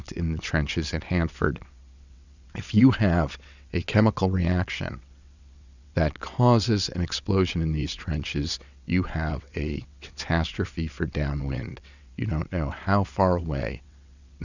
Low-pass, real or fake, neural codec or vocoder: 7.2 kHz; fake; vocoder, 22.05 kHz, 80 mel bands, WaveNeXt